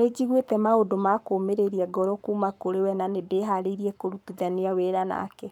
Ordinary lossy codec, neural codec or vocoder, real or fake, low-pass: none; codec, 44.1 kHz, 7.8 kbps, Pupu-Codec; fake; 19.8 kHz